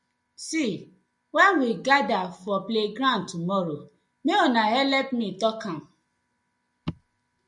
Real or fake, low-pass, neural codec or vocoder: real; 10.8 kHz; none